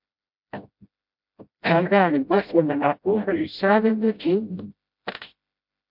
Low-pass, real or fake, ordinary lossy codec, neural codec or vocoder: 5.4 kHz; fake; MP3, 48 kbps; codec, 16 kHz, 0.5 kbps, FreqCodec, smaller model